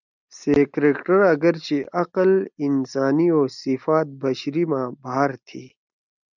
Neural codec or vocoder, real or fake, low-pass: none; real; 7.2 kHz